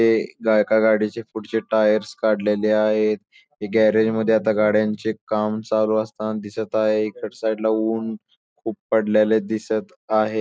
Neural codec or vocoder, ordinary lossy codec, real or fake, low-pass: none; none; real; none